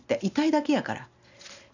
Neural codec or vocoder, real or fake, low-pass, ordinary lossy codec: none; real; 7.2 kHz; none